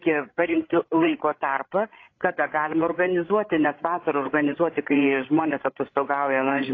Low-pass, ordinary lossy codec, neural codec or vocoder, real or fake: 7.2 kHz; AAC, 32 kbps; codec, 16 kHz, 16 kbps, FreqCodec, larger model; fake